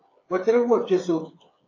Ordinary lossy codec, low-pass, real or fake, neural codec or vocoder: AAC, 32 kbps; 7.2 kHz; fake; codec, 16 kHz, 8 kbps, FreqCodec, smaller model